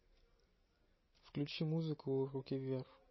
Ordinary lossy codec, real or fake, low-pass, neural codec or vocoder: MP3, 24 kbps; real; 7.2 kHz; none